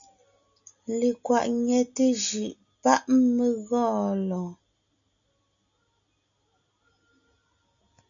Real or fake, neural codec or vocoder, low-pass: real; none; 7.2 kHz